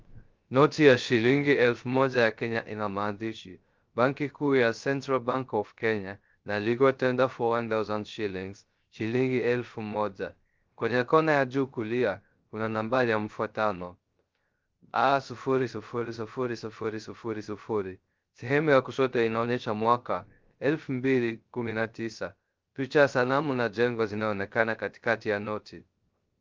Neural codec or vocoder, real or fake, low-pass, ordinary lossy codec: codec, 16 kHz, 0.3 kbps, FocalCodec; fake; 7.2 kHz; Opus, 32 kbps